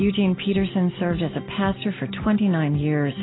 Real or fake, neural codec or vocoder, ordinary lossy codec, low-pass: real; none; AAC, 16 kbps; 7.2 kHz